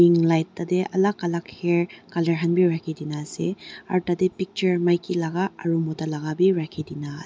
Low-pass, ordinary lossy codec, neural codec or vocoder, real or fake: none; none; none; real